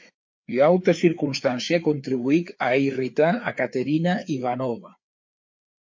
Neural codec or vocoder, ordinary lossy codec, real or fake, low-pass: codec, 16 kHz, 4 kbps, FreqCodec, larger model; MP3, 48 kbps; fake; 7.2 kHz